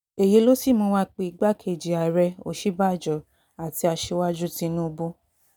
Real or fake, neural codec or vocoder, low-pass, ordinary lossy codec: real; none; 19.8 kHz; none